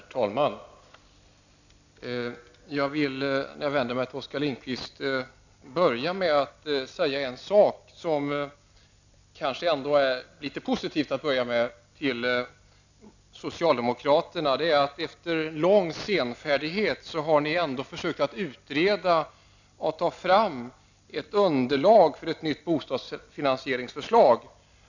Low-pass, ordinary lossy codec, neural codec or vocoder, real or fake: 7.2 kHz; none; none; real